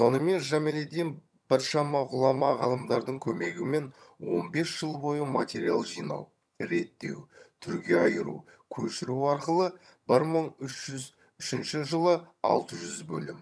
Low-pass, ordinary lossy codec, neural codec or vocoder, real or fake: none; none; vocoder, 22.05 kHz, 80 mel bands, HiFi-GAN; fake